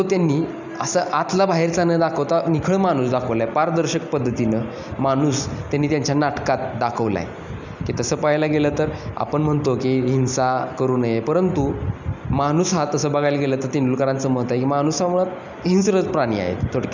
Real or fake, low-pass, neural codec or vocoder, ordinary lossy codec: real; 7.2 kHz; none; none